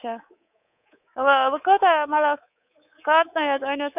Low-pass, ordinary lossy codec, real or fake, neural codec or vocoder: 3.6 kHz; none; fake; codec, 24 kHz, 3.1 kbps, DualCodec